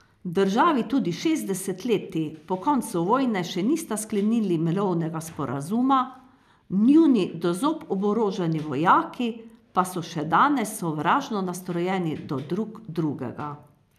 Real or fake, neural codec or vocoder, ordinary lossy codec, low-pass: real; none; none; 14.4 kHz